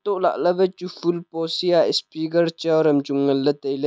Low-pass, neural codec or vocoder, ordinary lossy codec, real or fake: none; none; none; real